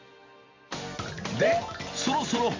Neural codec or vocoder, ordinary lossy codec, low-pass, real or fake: none; MP3, 48 kbps; 7.2 kHz; real